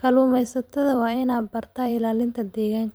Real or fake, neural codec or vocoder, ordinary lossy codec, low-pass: fake; vocoder, 44.1 kHz, 128 mel bands every 512 samples, BigVGAN v2; none; none